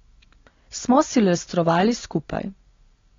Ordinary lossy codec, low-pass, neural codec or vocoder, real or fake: AAC, 32 kbps; 7.2 kHz; none; real